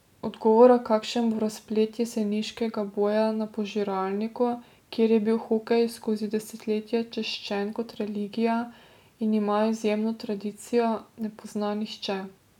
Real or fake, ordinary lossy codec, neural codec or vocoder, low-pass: real; none; none; 19.8 kHz